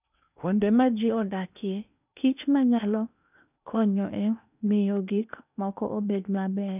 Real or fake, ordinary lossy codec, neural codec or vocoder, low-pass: fake; none; codec, 16 kHz in and 24 kHz out, 0.8 kbps, FocalCodec, streaming, 65536 codes; 3.6 kHz